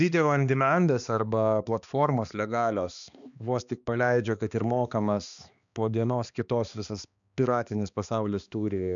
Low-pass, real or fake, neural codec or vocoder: 7.2 kHz; fake; codec, 16 kHz, 2 kbps, X-Codec, HuBERT features, trained on balanced general audio